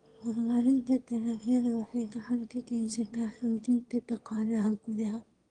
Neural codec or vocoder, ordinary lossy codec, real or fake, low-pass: autoencoder, 22.05 kHz, a latent of 192 numbers a frame, VITS, trained on one speaker; Opus, 24 kbps; fake; 9.9 kHz